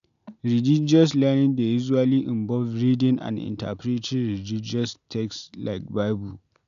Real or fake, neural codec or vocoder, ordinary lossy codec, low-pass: real; none; none; 7.2 kHz